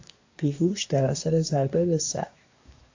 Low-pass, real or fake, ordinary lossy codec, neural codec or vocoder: 7.2 kHz; fake; AAC, 48 kbps; codec, 24 kHz, 1 kbps, SNAC